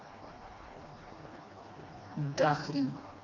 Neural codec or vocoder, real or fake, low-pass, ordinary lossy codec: codec, 16 kHz, 2 kbps, FreqCodec, smaller model; fake; 7.2 kHz; Opus, 64 kbps